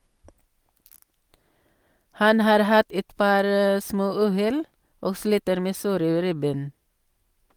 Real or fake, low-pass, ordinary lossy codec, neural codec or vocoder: real; 19.8 kHz; Opus, 32 kbps; none